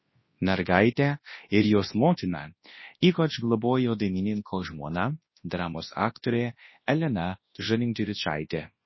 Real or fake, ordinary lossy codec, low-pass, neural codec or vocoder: fake; MP3, 24 kbps; 7.2 kHz; codec, 24 kHz, 0.9 kbps, WavTokenizer, large speech release